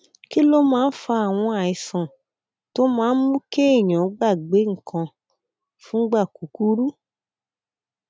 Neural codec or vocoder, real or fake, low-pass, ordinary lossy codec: none; real; none; none